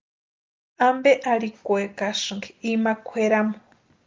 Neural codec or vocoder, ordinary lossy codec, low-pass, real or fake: none; Opus, 32 kbps; 7.2 kHz; real